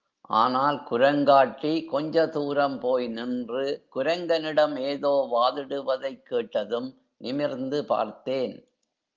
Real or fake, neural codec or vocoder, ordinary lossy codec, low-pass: real; none; Opus, 32 kbps; 7.2 kHz